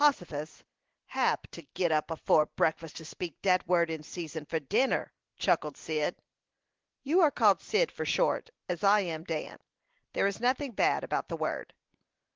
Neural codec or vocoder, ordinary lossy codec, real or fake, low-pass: none; Opus, 16 kbps; real; 7.2 kHz